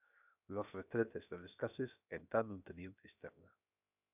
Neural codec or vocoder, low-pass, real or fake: codec, 16 kHz, 0.7 kbps, FocalCodec; 3.6 kHz; fake